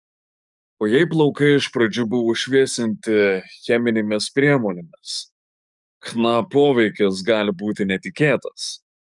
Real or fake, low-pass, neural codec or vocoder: fake; 10.8 kHz; codec, 44.1 kHz, 7.8 kbps, DAC